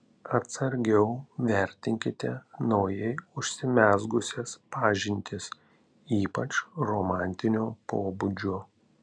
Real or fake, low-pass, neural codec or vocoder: real; 9.9 kHz; none